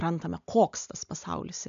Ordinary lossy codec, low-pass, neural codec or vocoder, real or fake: MP3, 64 kbps; 7.2 kHz; none; real